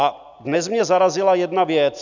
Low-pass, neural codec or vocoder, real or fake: 7.2 kHz; none; real